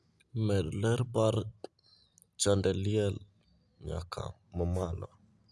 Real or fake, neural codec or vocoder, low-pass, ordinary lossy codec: real; none; none; none